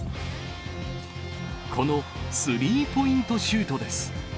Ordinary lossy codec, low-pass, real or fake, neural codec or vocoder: none; none; real; none